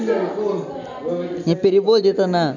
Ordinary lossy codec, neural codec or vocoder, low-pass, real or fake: none; none; 7.2 kHz; real